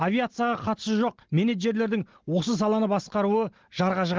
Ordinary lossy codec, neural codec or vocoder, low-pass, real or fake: Opus, 16 kbps; none; 7.2 kHz; real